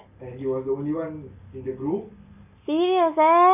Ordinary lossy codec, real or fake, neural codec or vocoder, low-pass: none; real; none; 3.6 kHz